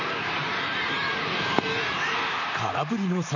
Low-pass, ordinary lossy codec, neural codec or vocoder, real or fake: 7.2 kHz; none; vocoder, 44.1 kHz, 128 mel bands, Pupu-Vocoder; fake